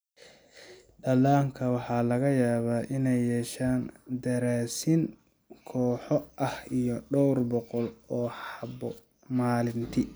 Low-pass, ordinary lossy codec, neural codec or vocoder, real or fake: none; none; none; real